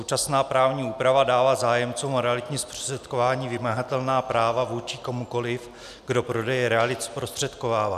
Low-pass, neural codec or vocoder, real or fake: 14.4 kHz; none; real